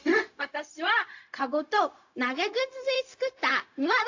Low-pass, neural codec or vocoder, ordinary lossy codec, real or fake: 7.2 kHz; codec, 16 kHz, 0.4 kbps, LongCat-Audio-Codec; AAC, 48 kbps; fake